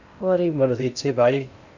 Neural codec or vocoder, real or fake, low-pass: codec, 16 kHz in and 24 kHz out, 0.6 kbps, FocalCodec, streaming, 2048 codes; fake; 7.2 kHz